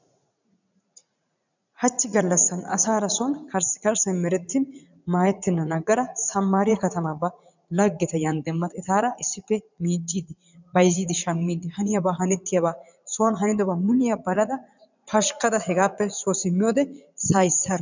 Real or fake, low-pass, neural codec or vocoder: fake; 7.2 kHz; vocoder, 44.1 kHz, 80 mel bands, Vocos